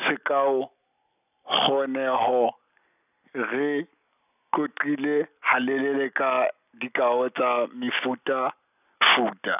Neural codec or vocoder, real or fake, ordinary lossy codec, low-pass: none; real; none; 3.6 kHz